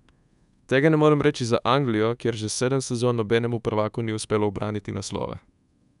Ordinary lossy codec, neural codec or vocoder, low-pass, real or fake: none; codec, 24 kHz, 1.2 kbps, DualCodec; 10.8 kHz; fake